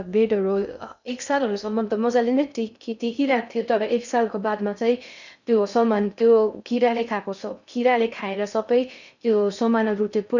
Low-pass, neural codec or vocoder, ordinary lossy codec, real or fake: 7.2 kHz; codec, 16 kHz in and 24 kHz out, 0.6 kbps, FocalCodec, streaming, 2048 codes; none; fake